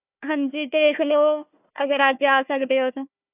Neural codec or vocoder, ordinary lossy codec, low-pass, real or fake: codec, 16 kHz, 1 kbps, FunCodec, trained on Chinese and English, 50 frames a second; AAC, 32 kbps; 3.6 kHz; fake